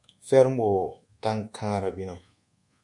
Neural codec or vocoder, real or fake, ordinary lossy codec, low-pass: codec, 24 kHz, 1.2 kbps, DualCodec; fake; MP3, 64 kbps; 10.8 kHz